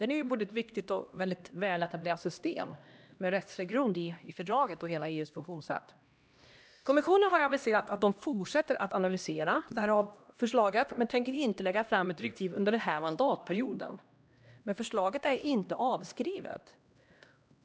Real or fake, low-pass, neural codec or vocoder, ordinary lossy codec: fake; none; codec, 16 kHz, 1 kbps, X-Codec, HuBERT features, trained on LibriSpeech; none